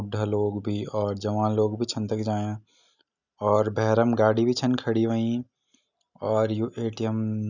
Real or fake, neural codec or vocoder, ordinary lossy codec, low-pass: real; none; none; 7.2 kHz